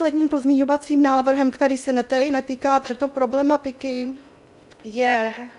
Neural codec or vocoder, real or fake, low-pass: codec, 16 kHz in and 24 kHz out, 0.6 kbps, FocalCodec, streaming, 2048 codes; fake; 10.8 kHz